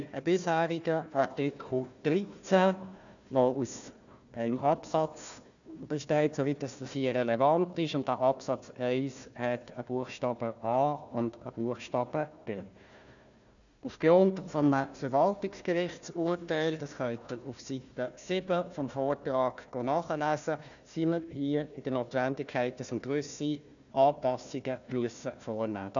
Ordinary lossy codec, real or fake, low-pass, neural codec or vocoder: none; fake; 7.2 kHz; codec, 16 kHz, 1 kbps, FunCodec, trained on Chinese and English, 50 frames a second